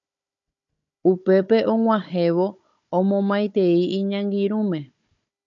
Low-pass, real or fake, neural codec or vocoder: 7.2 kHz; fake; codec, 16 kHz, 16 kbps, FunCodec, trained on Chinese and English, 50 frames a second